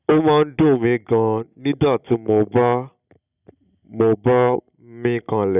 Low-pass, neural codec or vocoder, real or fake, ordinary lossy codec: 3.6 kHz; none; real; none